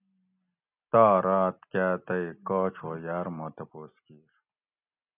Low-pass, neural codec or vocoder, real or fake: 3.6 kHz; none; real